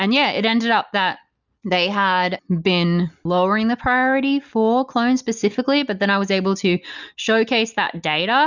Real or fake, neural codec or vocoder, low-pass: real; none; 7.2 kHz